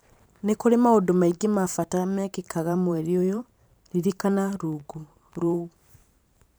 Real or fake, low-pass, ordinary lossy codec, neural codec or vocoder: fake; none; none; vocoder, 44.1 kHz, 128 mel bands every 512 samples, BigVGAN v2